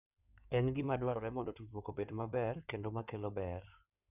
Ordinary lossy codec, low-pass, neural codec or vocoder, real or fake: none; 3.6 kHz; codec, 16 kHz in and 24 kHz out, 2.2 kbps, FireRedTTS-2 codec; fake